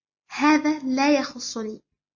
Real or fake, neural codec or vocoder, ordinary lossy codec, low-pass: real; none; MP3, 32 kbps; 7.2 kHz